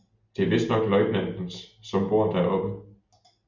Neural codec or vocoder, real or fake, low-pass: none; real; 7.2 kHz